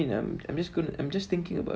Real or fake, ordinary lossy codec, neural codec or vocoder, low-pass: real; none; none; none